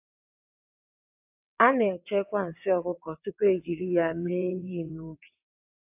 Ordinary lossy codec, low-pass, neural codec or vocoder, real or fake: AAC, 32 kbps; 3.6 kHz; vocoder, 22.05 kHz, 80 mel bands, WaveNeXt; fake